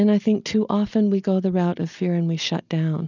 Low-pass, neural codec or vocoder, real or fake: 7.2 kHz; none; real